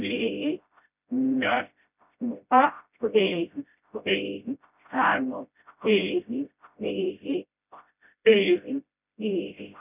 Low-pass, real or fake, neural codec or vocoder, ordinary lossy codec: 3.6 kHz; fake; codec, 16 kHz, 0.5 kbps, FreqCodec, smaller model; none